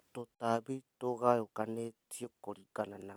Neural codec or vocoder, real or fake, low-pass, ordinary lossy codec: none; real; none; none